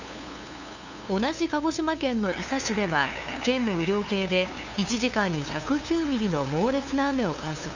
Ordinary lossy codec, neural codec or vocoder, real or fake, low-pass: none; codec, 16 kHz, 2 kbps, FunCodec, trained on LibriTTS, 25 frames a second; fake; 7.2 kHz